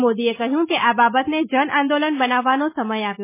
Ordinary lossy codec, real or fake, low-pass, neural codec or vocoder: MP3, 16 kbps; real; 3.6 kHz; none